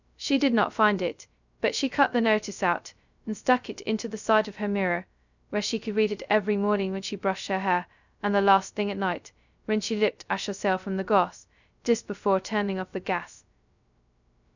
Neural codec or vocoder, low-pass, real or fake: codec, 16 kHz, 0.2 kbps, FocalCodec; 7.2 kHz; fake